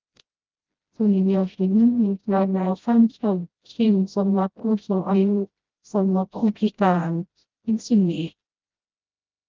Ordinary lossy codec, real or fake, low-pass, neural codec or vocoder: Opus, 32 kbps; fake; 7.2 kHz; codec, 16 kHz, 0.5 kbps, FreqCodec, smaller model